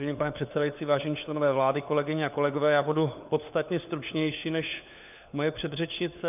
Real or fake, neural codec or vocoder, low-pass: fake; codec, 16 kHz, 4 kbps, FunCodec, trained on LibriTTS, 50 frames a second; 3.6 kHz